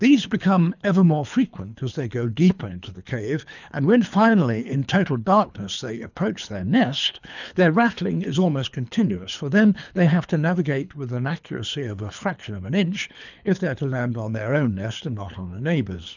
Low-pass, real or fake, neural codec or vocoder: 7.2 kHz; fake; codec, 24 kHz, 3 kbps, HILCodec